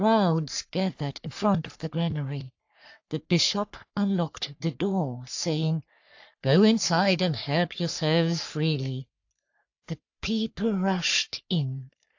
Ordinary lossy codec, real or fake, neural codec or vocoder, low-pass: AAC, 48 kbps; fake; codec, 44.1 kHz, 3.4 kbps, Pupu-Codec; 7.2 kHz